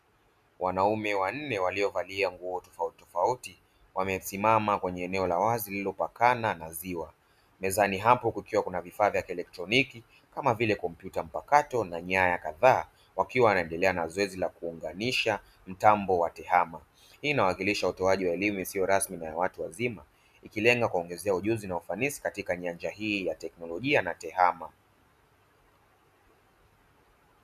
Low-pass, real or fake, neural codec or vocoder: 14.4 kHz; real; none